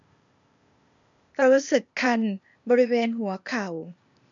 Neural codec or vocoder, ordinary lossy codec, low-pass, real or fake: codec, 16 kHz, 0.8 kbps, ZipCodec; none; 7.2 kHz; fake